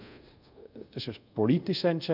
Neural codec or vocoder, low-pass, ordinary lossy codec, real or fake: codec, 24 kHz, 0.5 kbps, DualCodec; 5.4 kHz; none; fake